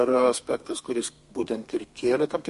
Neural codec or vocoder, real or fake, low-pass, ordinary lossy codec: codec, 32 kHz, 1.9 kbps, SNAC; fake; 14.4 kHz; MP3, 48 kbps